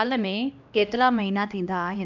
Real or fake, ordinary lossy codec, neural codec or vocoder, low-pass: fake; none; codec, 16 kHz, 1 kbps, X-Codec, HuBERT features, trained on LibriSpeech; 7.2 kHz